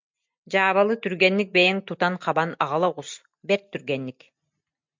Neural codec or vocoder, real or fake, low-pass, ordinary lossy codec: none; real; 7.2 kHz; MP3, 64 kbps